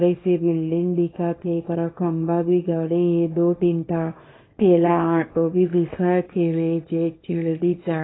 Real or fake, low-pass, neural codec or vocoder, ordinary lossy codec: fake; 7.2 kHz; codec, 24 kHz, 0.9 kbps, WavTokenizer, small release; AAC, 16 kbps